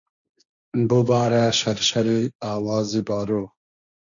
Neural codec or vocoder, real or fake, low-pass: codec, 16 kHz, 1.1 kbps, Voila-Tokenizer; fake; 7.2 kHz